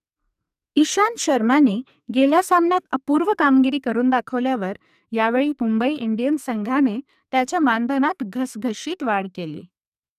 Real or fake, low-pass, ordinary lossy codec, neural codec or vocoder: fake; 14.4 kHz; none; codec, 44.1 kHz, 2.6 kbps, SNAC